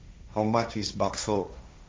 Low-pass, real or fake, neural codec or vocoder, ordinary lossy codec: none; fake; codec, 16 kHz, 1.1 kbps, Voila-Tokenizer; none